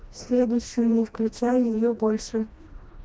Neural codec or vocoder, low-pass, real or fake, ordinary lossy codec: codec, 16 kHz, 1 kbps, FreqCodec, smaller model; none; fake; none